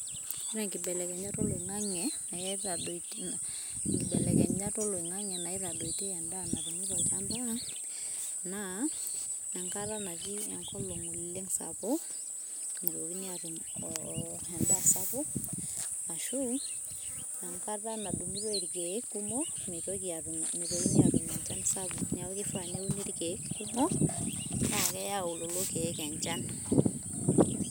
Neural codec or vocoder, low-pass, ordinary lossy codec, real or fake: none; none; none; real